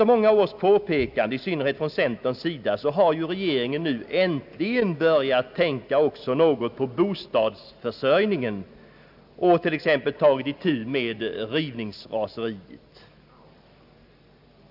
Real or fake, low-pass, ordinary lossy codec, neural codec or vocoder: real; 5.4 kHz; AAC, 48 kbps; none